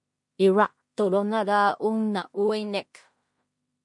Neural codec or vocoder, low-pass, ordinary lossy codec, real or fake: codec, 16 kHz in and 24 kHz out, 0.4 kbps, LongCat-Audio-Codec, two codebook decoder; 10.8 kHz; MP3, 48 kbps; fake